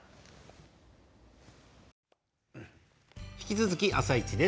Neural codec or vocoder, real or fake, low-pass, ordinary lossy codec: none; real; none; none